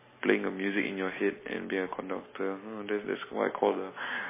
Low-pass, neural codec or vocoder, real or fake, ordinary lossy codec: 3.6 kHz; none; real; MP3, 16 kbps